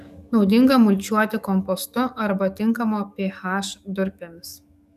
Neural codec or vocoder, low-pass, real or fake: codec, 44.1 kHz, 7.8 kbps, DAC; 14.4 kHz; fake